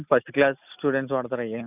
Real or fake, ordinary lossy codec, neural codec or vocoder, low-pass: real; none; none; 3.6 kHz